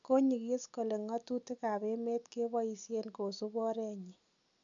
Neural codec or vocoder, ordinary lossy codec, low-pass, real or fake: none; none; 7.2 kHz; real